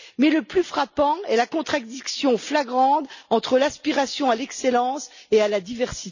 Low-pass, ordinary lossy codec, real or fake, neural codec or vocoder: 7.2 kHz; none; real; none